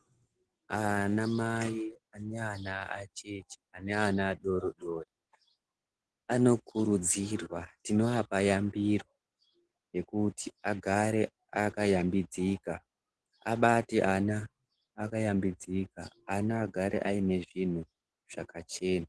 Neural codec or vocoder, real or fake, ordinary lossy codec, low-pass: none; real; Opus, 16 kbps; 10.8 kHz